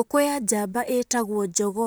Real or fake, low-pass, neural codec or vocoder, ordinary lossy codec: fake; none; vocoder, 44.1 kHz, 128 mel bands, Pupu-Vocoder; none